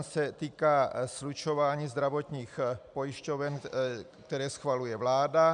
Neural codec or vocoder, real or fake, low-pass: none; real; 9.9 kHz